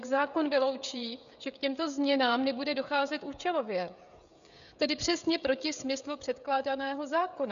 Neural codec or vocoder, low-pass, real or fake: codec, 16 kHz, 16 kbps, FreqCodec, smaller model; 7.2 kHz; fake